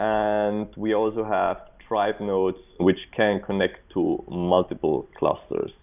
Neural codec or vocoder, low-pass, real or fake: none; 3.6 kHz; real